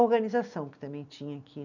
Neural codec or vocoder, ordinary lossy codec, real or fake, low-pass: none; none; real; 7.2 kHz